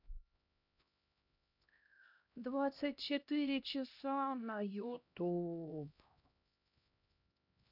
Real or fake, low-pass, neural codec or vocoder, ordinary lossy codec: fake; 5.4 kHz; codec, 16 kHz, 1 kbps, X-Codec, HuBERT features, trained on LibriSpeech; none